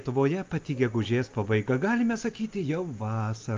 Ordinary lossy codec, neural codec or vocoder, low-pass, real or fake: Opus, 24 kbps; none; 7.2 kHz; real